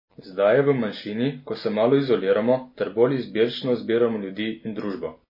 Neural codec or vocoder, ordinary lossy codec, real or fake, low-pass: none; MP3, 24 kbps; real; 5.4 kHz